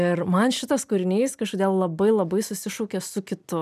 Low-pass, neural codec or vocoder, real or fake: 14.4 kHz; none; real